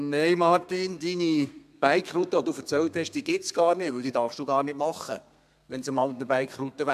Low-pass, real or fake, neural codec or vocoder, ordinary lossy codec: 14.4 kHz; fake; codec, 32 kHz, 1.9 kbps, SNAC; MP3, 96 kbps